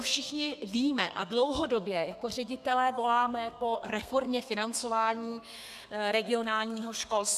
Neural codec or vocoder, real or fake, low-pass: codec, 32 kHz, 1.9 kbps, SNAC; fake; 14.4 kHz